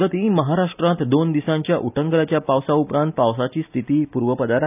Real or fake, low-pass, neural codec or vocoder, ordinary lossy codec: real; 3.6 kHz; none; none